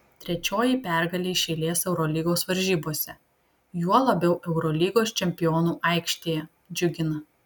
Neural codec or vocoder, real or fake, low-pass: none; real; 19.8 kHz